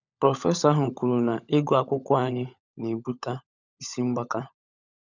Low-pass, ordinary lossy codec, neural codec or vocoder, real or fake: 7.2 kHz; none; codec, 16 kHz, 16 kbps, FunCodec, trained on LibriTTS, 50 frames a second; fake